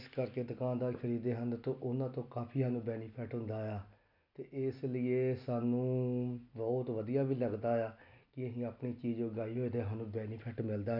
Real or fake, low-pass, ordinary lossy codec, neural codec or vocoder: real; 5.4 kHz; none; none